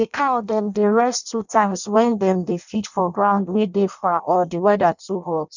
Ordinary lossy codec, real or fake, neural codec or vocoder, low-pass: none; fake; codec, 16 kHz in and 24 kHz out, 0.6 kbps, FireRedTTS-2 codec; 7.2 kHz